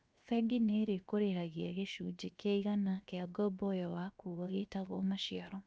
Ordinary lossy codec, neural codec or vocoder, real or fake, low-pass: none; codec, 16 kHz, 0.7 kbps, FocalCodec; fake; none